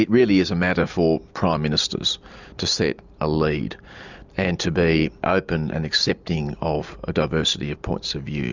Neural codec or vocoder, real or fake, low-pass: none; real; 7.2 kHz